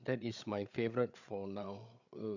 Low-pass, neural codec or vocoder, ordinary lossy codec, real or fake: 7.2 kHz; codec, 16 kHz, 8 kbps, FreqCodec, larger model; none; fake